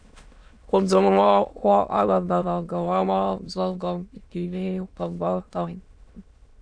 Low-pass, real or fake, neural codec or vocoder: 9.9 kHz; fake; autoencoder, 22.05 kHz, a latent of 192 numbers a frame, VITS, trained on many speakers